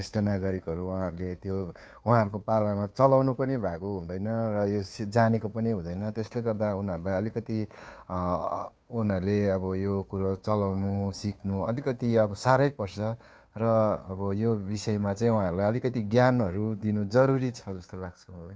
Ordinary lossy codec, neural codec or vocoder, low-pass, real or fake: none; codec, 16 kHz, 2 kbps, FunCodec, trained on Chinese and English, 25 frames a second; none; fake